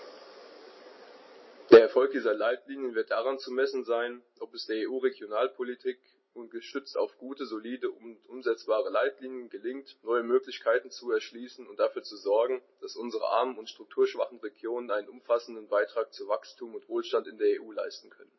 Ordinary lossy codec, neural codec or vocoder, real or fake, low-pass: MP3, 24 kbps; none; real; 7.2 kHz